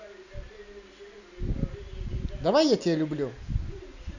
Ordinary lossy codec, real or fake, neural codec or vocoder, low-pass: none; real; none; 7.2 kHz